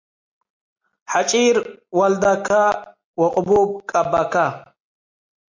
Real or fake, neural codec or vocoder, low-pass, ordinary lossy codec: real; none; 7.2 kHz; MP3, 48 kbps